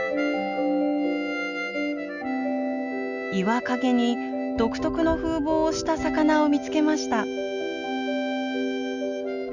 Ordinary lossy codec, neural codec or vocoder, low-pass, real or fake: Opus, 64 kbps; none; 7.2 kHz; real